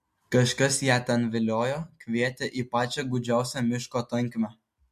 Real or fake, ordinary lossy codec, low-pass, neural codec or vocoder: real; MP3, 64 kbps; 14.4 kHz; none